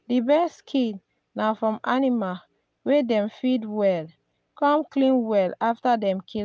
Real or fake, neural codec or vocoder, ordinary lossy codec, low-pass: real; none; none; none